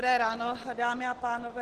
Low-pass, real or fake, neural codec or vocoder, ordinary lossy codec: 14.4 kHz; real; none; Opus, 16 kbps